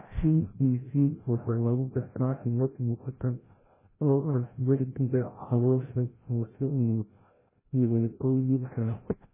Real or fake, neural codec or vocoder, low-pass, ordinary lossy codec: fake; codec, 16 kHz, 0.5 kbps, FreqCodec, larger model; 3.6 kHz; MP3, 16 kbps